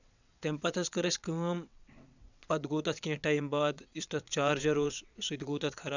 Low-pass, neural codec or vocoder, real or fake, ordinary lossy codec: 7.2 kHz; vocoder, 22.05 kHz, 80 mel bands, WaveNeXt; fake; none